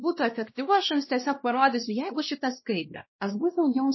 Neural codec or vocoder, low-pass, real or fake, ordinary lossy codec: codec, 16 kHz, 1 kbps, X-Codec, WavLM features, trained on Multilingual LibriSpeech; 7.2 kHz; fake; MP3, 24 kbps